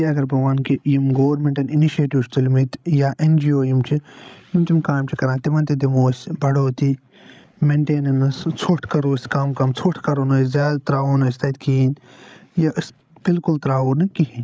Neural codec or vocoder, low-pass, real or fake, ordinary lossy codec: codec, 16 kHz, 16 kbps, FreqCodec, larger model; none; fake; none